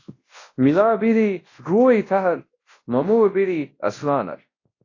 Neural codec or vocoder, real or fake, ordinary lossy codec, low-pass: codec, 24 kHz, 0.9 kbps, WavTokenizer, large speech release; fake; AAC, 32 kbps; 7.2 kHz